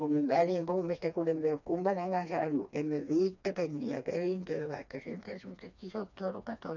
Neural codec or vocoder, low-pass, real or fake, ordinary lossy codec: codec, 16 kHz, 2 kbps, FreqCodec, smaller model; 7.2 kHz; fake; none